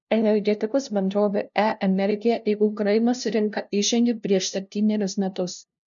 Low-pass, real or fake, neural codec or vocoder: 7.2 kHz; fake; codec, 16 kHz, 0.5 kbps, FunCodec, trained on LibriTTS, 25 frames a second